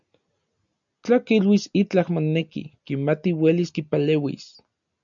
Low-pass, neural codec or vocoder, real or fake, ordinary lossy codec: 7.2 kHz; none; real; MP3, 96 kbps